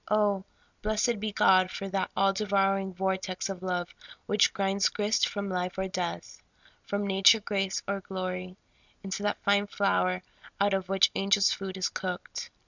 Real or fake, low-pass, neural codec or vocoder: real; 7.2 kHz; none